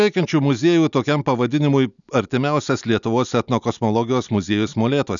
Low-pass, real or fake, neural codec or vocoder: 7.2 kHz; real; none